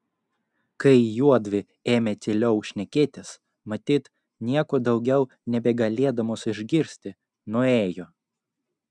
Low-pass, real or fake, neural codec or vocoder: 10.8 kHz; real; none